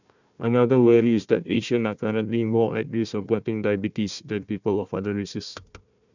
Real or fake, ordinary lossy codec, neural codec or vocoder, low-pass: fake; none; codec, 16 kHz, 1 kbps, FunCodec, trained on Chinese and English, 50 frames a second; 7.2 kHz